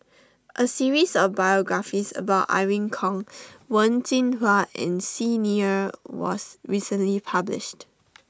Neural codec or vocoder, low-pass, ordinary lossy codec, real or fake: none; none; none; real